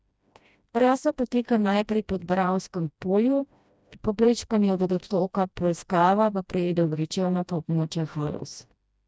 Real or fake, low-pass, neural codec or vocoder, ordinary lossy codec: fake; none; codec, 16 kHz, 1 kbps, FreqCodec, smaller model; none